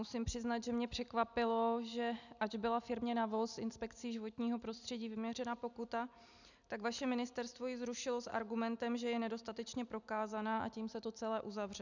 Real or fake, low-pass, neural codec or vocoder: real; 7.2 kHz; none